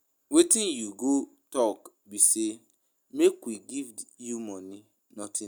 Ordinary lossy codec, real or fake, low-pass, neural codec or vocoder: none; real; none; none